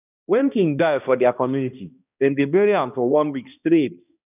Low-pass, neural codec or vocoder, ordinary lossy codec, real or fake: 3.6 kHz; codec, 16 kHz, 1 kbps, X-Codec, HuBERT features, trained on balanced general audio; none; fake